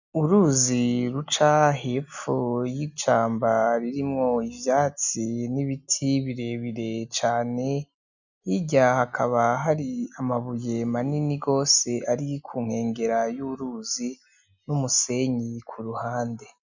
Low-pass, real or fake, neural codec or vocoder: 7.2 kHz; real; none